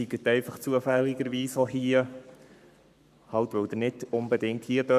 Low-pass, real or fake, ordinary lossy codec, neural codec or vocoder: 14.4 kHz; fake; none; codec, 44.1 kHz, 7.8 kbps, Pupu-Codec